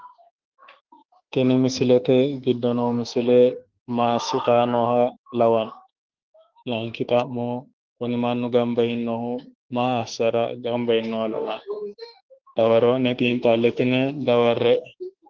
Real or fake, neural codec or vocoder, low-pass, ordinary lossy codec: fake; autoencoder, 48 kHz, 32 numbers a frame, DAC-VAE, trained on Japanese speech; 7.2 kHz; Opus, 16 kbps